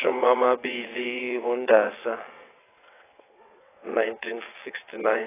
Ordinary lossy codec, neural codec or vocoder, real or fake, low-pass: AAC, 16 kbps; vocoder, 22.05 kHz, 80 mel bands, WaveNeXt; fake; 3.6 kHz